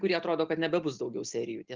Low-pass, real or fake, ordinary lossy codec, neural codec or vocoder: 7.2 kHz; real; Opus, 32 kbps; none